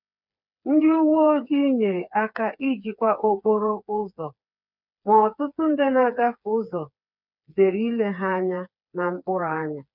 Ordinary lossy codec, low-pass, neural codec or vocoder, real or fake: none; 5.4 kHz; codec, 16 kHz, 4 kbps, FreqCodec, smaller model; fake